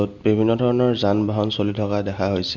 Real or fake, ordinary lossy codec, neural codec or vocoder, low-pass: real; Opus, 64 kbps; none; 7.2 kHz